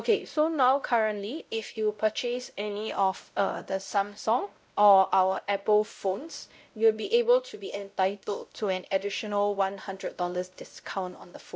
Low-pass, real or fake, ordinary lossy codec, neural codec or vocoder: none; fake; none; codec, 16 kHz, 0.5 kbps, X-Codec, WavLM features, trained on Multilingual LibriSpeech